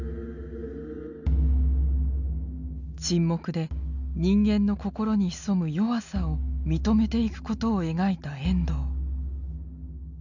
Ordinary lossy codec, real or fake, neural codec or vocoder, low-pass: none; real; none; 7.2 kHz